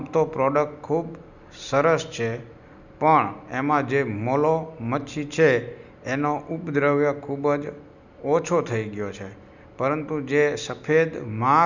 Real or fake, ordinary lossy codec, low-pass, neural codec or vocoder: real; none; 7.2 kHz; none